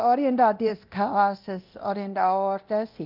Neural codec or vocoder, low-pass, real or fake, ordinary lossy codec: codec, 24 kHz, 0.9 kbps, DualCodec; 5.4 kHz; fake; Opus, 32 kbps